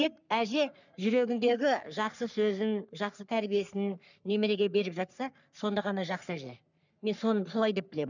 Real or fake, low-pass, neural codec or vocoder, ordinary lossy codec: fake; 7.2 kHz; codec, 44.1 kHz, 3.4 kbps, Pupu-Codec; none